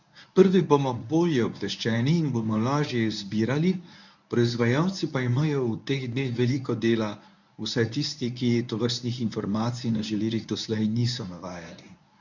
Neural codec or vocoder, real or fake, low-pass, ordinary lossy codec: codec, 24 kHz, 0.9 kbps, WavTokenizer, medium speech release version 1; fake; 7.2 kHz; none